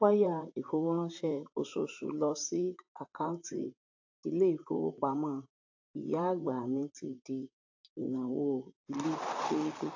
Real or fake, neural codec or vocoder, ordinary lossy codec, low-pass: fake; vocoder, 44.1 kHz, 128 mel bands, Pupu-Vocoder; AAC, 48 kbps; 7.2 kHz